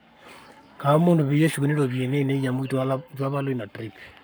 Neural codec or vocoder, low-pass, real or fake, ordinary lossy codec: codec, 44.1 kHz, 7.8 kbps, Pupu-Codec; none; fake; none